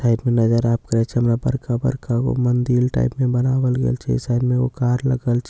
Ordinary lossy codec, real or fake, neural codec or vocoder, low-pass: none; real; none; none